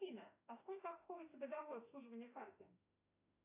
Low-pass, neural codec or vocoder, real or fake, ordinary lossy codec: 3.6 kHz; autoencoder, 48 kHz, 32 numbers a frame, DAC-VAE, trained on Japanese speech; fake; AAC, 24 kbps